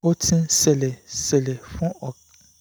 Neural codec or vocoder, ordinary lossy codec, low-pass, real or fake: none; none; none; real